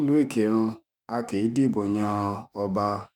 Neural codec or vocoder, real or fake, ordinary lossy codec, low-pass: autoencoder, 48 kHz, 32 numbers a frame, DAC-VAE, trained on Japanese speech; fake; none; 19.8 kHz